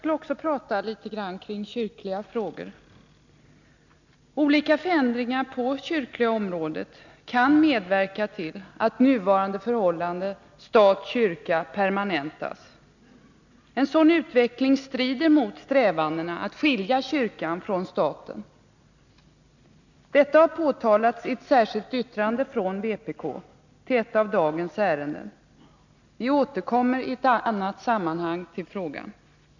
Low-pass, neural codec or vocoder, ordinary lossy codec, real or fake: 7.2 kHz; none; none; real